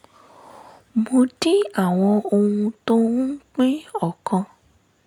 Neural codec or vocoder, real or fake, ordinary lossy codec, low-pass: none; real; none; 19.8 kHz